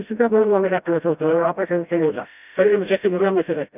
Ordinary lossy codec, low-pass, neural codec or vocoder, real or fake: none; 3.6 kHz; codec, 16 kHz, 0.5 kbps, FreqCodec, smaller model; fake